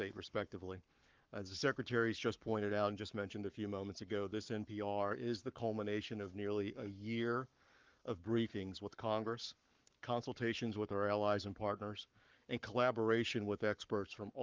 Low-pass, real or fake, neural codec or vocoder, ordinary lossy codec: 7.2 kHz; fake; codec, 44.1 kHz, 7.8 kbps, Pupu-Codec; Opus, 24 kbps